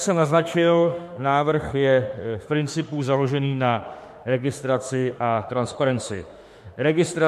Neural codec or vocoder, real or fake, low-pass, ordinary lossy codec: autoencoder, 48 kHz, 32 numbers a frame, DAC-VAE, trained on Japanese speech; fake; 14.4 kHz; MP3, 64 kbps